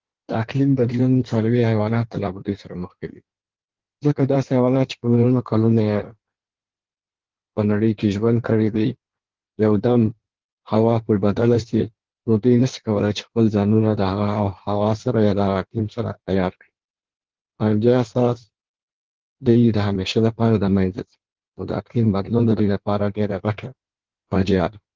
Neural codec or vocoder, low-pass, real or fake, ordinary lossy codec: codec, 16 kHz in and 24 kHz out, 1.1 kbps, FireRedTTS-2 codec; 7.2 kHz; fake; Opus, 16 kbps